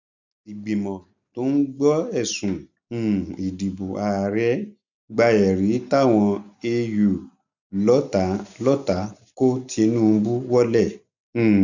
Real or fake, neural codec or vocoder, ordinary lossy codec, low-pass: real; none; none; 7.2 kHz